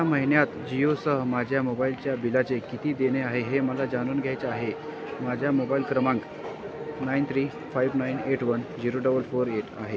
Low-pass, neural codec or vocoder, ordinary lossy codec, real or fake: none; none; none; real